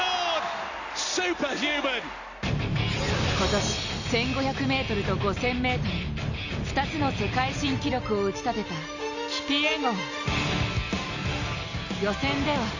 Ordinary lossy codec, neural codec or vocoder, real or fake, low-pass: none; none; real; 7.2 kHz